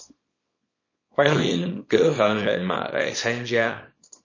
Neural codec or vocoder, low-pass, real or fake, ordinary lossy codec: codec, 24 kHz, 0.9 kbps, WavTokenizer, small release; 7.2 kHz; fake; MP3, 32 kbps